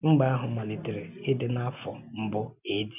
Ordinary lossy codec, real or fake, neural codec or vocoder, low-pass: none; real; none; 3.6 kHz